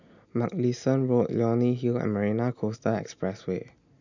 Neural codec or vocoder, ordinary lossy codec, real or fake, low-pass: none; none; real; 7.2 kHz